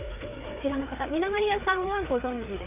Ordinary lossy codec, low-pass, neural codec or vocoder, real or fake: none; 3.6 kHz; codec, 16 kHz, 4 kbps, FreqCodec, larger model; fake